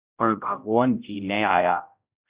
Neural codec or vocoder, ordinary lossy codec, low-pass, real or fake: codec, 16 kHz, 0.5 kbps, X-Codec, HuBERT features, trained on general audio; Opus, 64 kbps; 3.6 kHz; fake